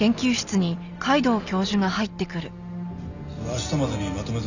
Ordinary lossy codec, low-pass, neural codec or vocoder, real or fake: none; 7.2 kHz; none; real